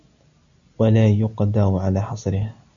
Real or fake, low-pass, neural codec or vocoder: real; 7.2 kHz; none